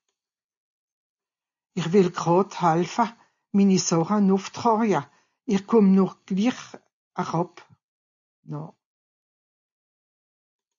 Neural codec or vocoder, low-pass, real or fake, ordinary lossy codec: none; 7.2 kHz; real; MP3, 64 kbps